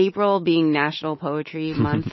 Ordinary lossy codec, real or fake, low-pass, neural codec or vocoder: MP3, 24 kbps; fake; 7.2 kHz; autoencoder, 48 kHz, 128 numbers a frame, DAC-VAE, trained on Japanese speech